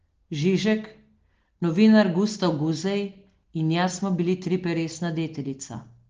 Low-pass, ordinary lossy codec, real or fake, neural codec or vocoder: 7.2 kHz; Opus, 16 kbps; real; none